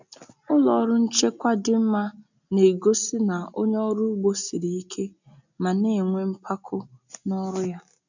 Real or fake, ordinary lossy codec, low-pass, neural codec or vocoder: real; none; 7.2 kHz; none